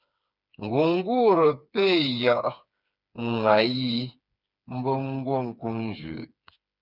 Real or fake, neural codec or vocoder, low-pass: fake; codec, 16 kHz, 4 kbps, FreqCodec, smaller model; 5.4 kHz